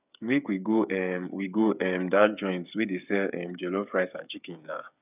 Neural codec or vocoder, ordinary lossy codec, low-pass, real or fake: codec, 16 kHz, 8 kbps, FreqCodec, smaller model; none; 3.6 kHz; fake